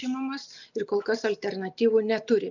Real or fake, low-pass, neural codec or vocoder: real; 7.2 kHz; none